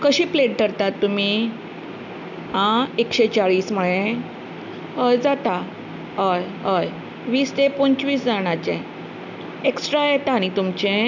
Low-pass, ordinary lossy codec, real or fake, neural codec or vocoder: 7.2 kHz; none; real; none